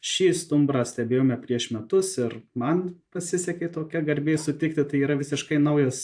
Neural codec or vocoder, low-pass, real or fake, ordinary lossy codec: vocoder, 44.1 kHz, 128 mel bands every 512 samples, BigVGAN v2; 9.9 kHz; fake; AAC, 64 kbps